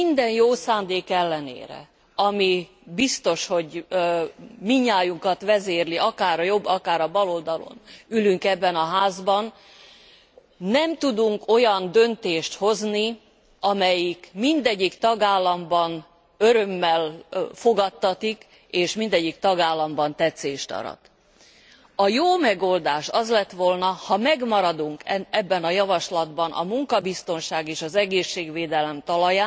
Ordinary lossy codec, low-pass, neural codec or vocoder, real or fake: none; none; none; real